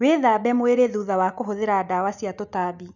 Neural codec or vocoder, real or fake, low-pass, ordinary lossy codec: none; real; 7.2 kHz; none